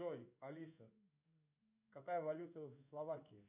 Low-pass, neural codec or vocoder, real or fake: 3.6 kHz; codec, 16 kHz in and 24 kHz out, 1 kbps, XY-Tokenizer; fake